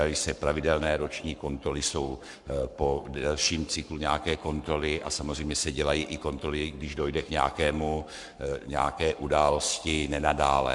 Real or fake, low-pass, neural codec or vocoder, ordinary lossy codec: fake; 10.8 kHz; codec, 44.1 kHz, 7.8 kbps, Pupu-Codec; MP3, 96 kbps